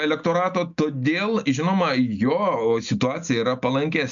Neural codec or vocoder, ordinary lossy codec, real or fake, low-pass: none; MP3, 96 kbps; real; 7.2 kHz